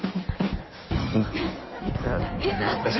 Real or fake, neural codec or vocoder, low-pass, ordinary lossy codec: fake; codec, 16 kHz in and 24 kHz out, 1.1 kbps, FireRedTTS-2 codec; 7.2 kHz; MP3, 24 kbps